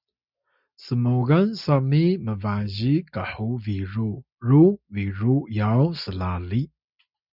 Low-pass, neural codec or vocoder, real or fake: 5.4 kHz; none; real